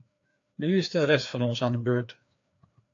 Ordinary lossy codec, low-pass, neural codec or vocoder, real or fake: AAC, 48 kbps; 7.2 kHz; codec, 16 kHz, 2 kbps, FreqCodec, larger model; fake